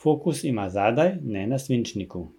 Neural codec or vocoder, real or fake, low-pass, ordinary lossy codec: none; real; 19.8 kHz; MP3, 96 kbps